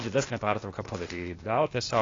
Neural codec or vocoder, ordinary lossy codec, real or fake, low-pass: codec, 16 kHz, 0.8 kbps, ZipCodec; AAC, 32 kbps; fake; 7.2 kHz